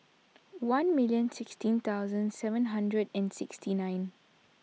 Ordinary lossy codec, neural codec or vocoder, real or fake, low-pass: none; none; real; none